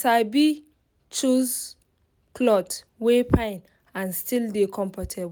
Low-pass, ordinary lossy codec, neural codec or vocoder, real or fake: none; none; none; real